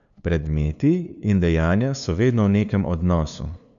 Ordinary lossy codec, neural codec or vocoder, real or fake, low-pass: none; codec, 16 kHz, 2 kbps, FunCodec, trained on LibriTTS, 25 frames a second; fake; 7.2 kHz